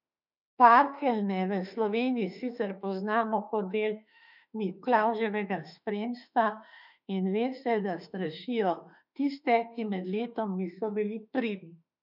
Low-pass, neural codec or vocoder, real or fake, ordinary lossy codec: 5.4 kHz; autoencoder, 48 kHz, 32 numbers a frame, DAC-VAE, trained on Japanese speech; fake; none